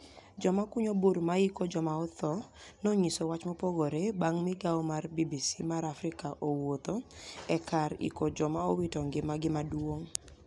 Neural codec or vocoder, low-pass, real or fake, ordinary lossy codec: none; 10.8 kHz; real; none